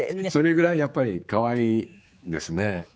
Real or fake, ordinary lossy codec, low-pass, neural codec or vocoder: fake; none; none; codec, 16 kHz, 4 kbps, X-Codec, HuBERT features, trained on general audio